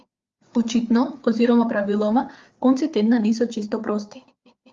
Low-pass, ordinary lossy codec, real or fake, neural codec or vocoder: 7.2 kHz; Opus, 32 kbps; fake; codec, 16 kHz, 4 kbps, FreqCodec, larger model